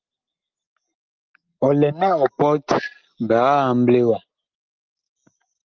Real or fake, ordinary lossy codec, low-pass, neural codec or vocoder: real; Opus, 32 kbps; 7.2 kHz; none